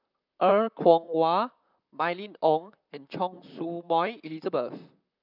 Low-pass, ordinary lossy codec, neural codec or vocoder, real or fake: 5.4 kHz; none; vocoder, 44.1 kHz, 128 mel bands, Pupu-Vocoder; fake